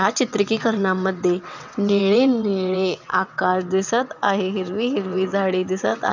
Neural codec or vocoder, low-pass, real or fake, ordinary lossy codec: vocoder, 22.05 kHz, 80 mel bands, WaveNeXt; 7.2 kHz; fake; none